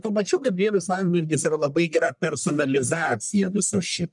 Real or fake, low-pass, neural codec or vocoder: fake; 10.8 kHz; codec, 44.1 kHz, 1.7 kbps, Pupu-Codec